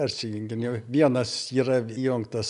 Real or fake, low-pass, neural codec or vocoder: real; 10.8 kHz; none